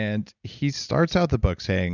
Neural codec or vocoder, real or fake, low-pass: none; real; 7.2 kHz